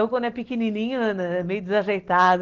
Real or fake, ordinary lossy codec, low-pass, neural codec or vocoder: real; Opus, 16 kbps; 7.2 kHz; none